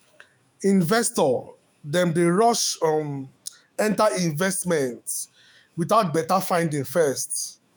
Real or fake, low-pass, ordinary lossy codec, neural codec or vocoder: fake; none; none; autoencoder, 48 kHz, 128 numbers a frame, DAC-VAE, trained on Japanese speech